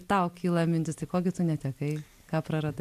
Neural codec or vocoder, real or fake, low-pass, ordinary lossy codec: none; real; 14.4 kHz; MP3, 96 kbps